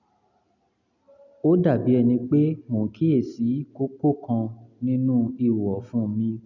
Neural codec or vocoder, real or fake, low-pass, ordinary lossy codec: none; real; 7.2 kHz; none